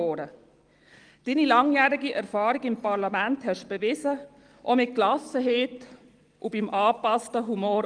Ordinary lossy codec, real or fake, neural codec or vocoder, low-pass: Opus, 24 kbps; real; none; 9.9 kHz